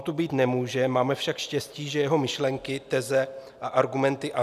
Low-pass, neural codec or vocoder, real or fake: 14.4 kHz; none; real